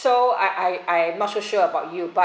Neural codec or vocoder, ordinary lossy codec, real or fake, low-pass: none; none; real; none